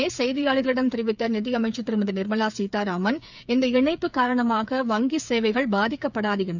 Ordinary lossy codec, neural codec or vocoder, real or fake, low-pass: none; codec, 16 kHz, 8 kbps, FreqCodec, smaller model; fake; 7.2 kHz